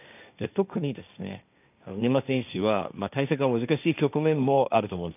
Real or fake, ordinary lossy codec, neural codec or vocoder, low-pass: fake; none; codec, 16 kHz, 1.1 kbps, Voila-Tokenizer; 3.6 kHz